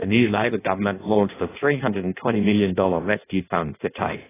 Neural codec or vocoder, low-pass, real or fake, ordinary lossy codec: codec, 16 kHz in and 24 kHz out, 0.6 kbps, FireRedTTS-2 codec; 3.6 kHz; fake; AAC, 16 kbps